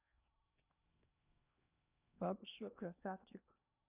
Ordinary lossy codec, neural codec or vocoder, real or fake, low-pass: none; codec, 16 kHz in and 24 kHz out, 0.8 kbps, FocalCodec, streaming, 65536 codes; fake; 3.6 kHz